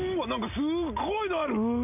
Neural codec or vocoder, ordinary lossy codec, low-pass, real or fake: none; none; 3.6 kHz; real